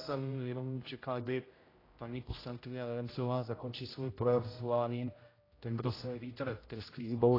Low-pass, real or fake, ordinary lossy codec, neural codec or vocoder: 5.4 kHz; fake; AAC, 24 kbps; codec, 16 kHz, 0.5 kbps, X-Codec, HuBERT features, trained on general audio